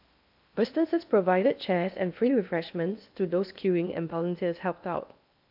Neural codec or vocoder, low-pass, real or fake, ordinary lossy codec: codec, 16 kHz in and 24 kHz out, 0.8 kbps, FocalCodec, streaming, 65536 codes; 5.4 kHz; fake; AAC, 48 kbps